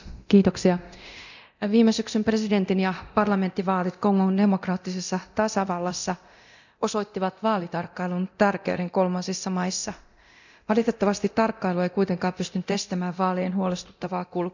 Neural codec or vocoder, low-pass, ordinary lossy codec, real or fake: codec, 24 kHz, 0.9 kbps, DualCodec; 7.2 kHz; none; fake